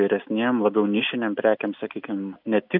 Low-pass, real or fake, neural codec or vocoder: 5.4 kHz; real; none